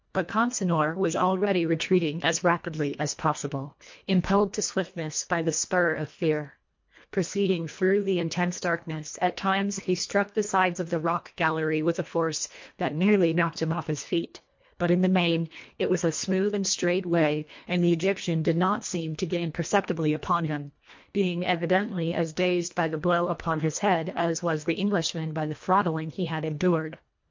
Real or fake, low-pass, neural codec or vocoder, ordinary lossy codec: fake; 7.2 kHz; codec, 24 kHz, 1.5 kbps, HILCodec; MP3, 48 kbps